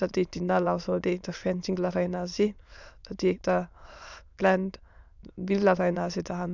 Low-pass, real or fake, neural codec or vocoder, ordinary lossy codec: 7.2 kHz; fake; autoencoder, 22.05 kHz, a latent of 192 numbers a frame, VITS, trained on many speakers; none